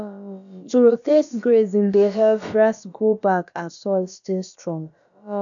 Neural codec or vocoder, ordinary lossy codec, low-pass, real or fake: codec, 16 kHz, about 1 kbps, DyCAST, with the encoder's durations; none; 7.2 kHz; fake